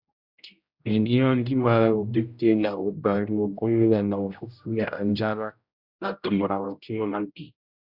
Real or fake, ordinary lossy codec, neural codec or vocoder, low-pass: fake; Opus, 64 kbps; codec, 16 kHz, 0.5 kbps, X-Codec, HuBERT features, trained on general audio; 5.4 kHz